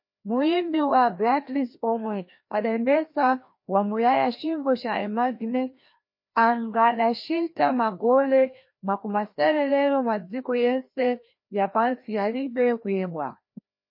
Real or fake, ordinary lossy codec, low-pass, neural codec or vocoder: fake; MP3, 32 kbps; 5.4 kHz; codec, 16 kHz, 1 kbps, FreqCodec, larger model